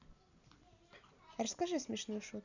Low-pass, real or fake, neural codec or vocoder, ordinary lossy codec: 7.2 kHz; real; none; none